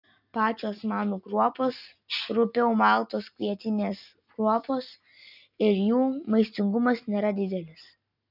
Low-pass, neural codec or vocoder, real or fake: 5.4 kHz; none; real